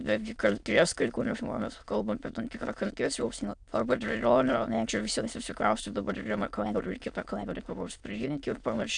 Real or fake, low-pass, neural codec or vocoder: fake; 9.9 kHz; autoencoder, 22.05 kHz, a latent of 192 numbers a frame, VITS, trained on many speakers